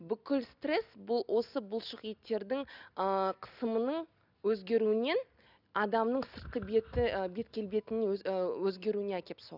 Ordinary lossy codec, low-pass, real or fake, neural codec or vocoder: none; 5.4 kHz; real; none